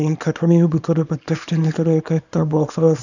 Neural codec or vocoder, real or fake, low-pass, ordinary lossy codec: codec, 24 kHz, 0.9 kbps, WavTokenizer, small release; fake; 7.2 kHz; none